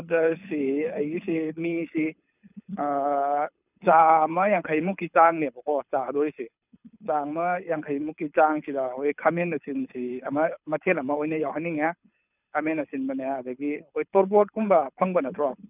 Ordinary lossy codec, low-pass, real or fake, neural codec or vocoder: none; 3.6 kHz; fake; codec, 24 kHz, 6 kbps, HILCodec